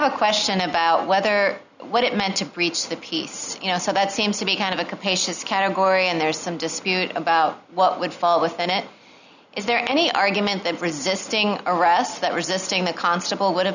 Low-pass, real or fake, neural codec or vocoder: 7.2 kHz; real; none